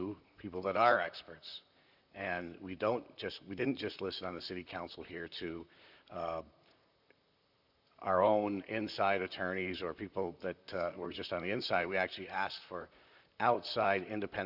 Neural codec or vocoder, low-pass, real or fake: vocoder, 44.1 kHz, 128 mel bands, Pupu-Vocoder; 5.4 kHz; fake